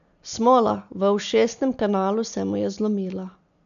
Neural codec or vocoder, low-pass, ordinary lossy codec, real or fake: none; 7.2 kHz; none; real